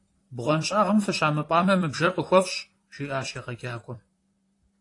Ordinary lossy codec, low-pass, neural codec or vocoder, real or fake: AAC, 48 kbps; 10.8 kHz; vocoder, 44.1 kHz, 128 mel bands, Pupu-Vocoder; fake